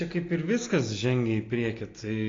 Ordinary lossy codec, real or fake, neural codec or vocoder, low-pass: AAC, 32 kbps; real; none; 7.2 kHz